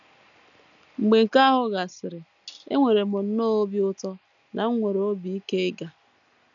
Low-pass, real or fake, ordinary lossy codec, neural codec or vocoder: 7.2 kHz; real; none; none